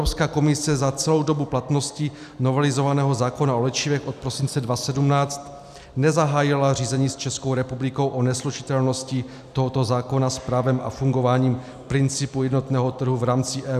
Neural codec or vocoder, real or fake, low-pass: none; real; 14.4 kHz